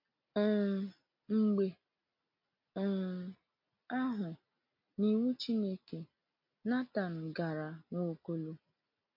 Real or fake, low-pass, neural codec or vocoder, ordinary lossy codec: real; 5.4 kHz; none; MP3, 32 kbps